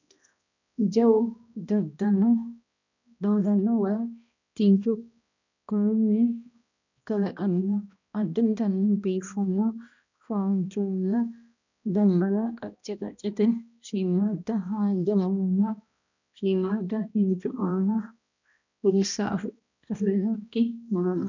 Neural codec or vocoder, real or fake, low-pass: codec, 16 kHz, 1 kbps, X-Codec, HuBERT features, trained on balanced general audio; fake; 7.2 kHz